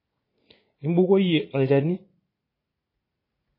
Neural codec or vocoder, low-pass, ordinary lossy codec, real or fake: vocoder, 44.1 kHz, 80 mel bands, Vocos; 5.4 kHz; MP3, 24 kbps; fake